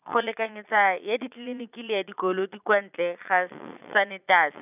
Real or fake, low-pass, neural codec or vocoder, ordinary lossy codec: fake; 3.6 kHz; vocoder, 22.05 kHz, 80 mel bands, Vocos; none